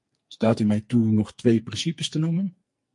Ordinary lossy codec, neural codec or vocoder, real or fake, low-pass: MP3, 48 kbps; codec, 44.1 kHz, 2.6 kbps, SNAC; fake; 10.8 kHz